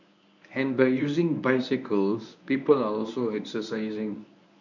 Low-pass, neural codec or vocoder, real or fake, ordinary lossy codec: 7.2 kHz; codec, 24 kHz, 0.9 kbps, WavTokenizer, medium speech release version 1; fake; none